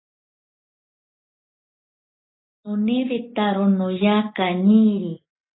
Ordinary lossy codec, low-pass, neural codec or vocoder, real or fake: AAC, 16 kbps; 7.2 kHz; none; real